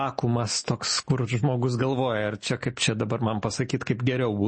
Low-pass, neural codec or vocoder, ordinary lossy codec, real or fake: 10.8 kHz; none; MP3, 32 kbps; real